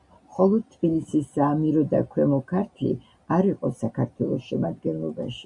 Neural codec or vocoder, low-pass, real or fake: none; 10.8 kHz; real